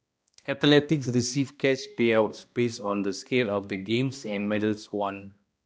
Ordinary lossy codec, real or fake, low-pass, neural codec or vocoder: none; fake; none; codec, 16 kHz, 1 kbps, X-Codec, HuBERT features, trained on balanced general audio